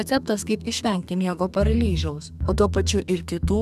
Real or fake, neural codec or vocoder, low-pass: fake; codec, 32 kHz, 1.9 kbps, SNAC; 14.4 kHz